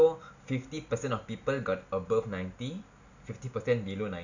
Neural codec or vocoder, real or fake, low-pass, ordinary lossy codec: none; real; 7.2 kHz; none